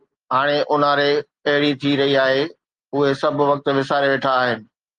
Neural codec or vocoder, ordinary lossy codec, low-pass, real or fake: none; Opus, 24 kbps; 9.9 kHz; real